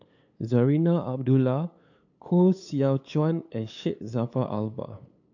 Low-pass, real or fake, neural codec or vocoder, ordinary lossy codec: 7.2 kHz; fake; codec, 16 kHz, 8 kbps, FunCodec, trained on LibriTTS, 25 frames a second; AAC, 48 kbps